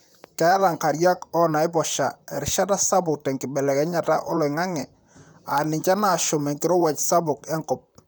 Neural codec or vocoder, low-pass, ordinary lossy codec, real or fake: vocoder, 44.1 kHz, 128 mel bands, Pupu-Vocoder; none; none; fake